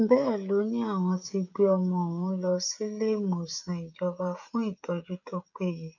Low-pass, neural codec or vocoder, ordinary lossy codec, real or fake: 7.2 kHz; codec, 16 kHz, 16 kbps, FreqCodec, smaller model; none; fake